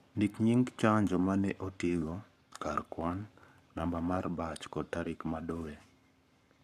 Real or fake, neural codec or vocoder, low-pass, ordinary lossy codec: fake; codec, 44.1 kHz, 7.8 kbps, Pupu-Codec; 14.4 kHz; none